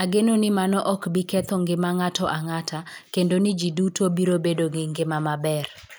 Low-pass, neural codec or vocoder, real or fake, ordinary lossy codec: none; none; real; none